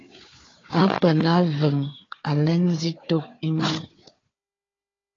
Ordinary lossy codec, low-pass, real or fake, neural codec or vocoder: AAC, 32 kbps; 7.2 kHz; fake; codec, 16 kHz, 4 kbps, FunCodec, trained on Chinese and English, 50 frames a second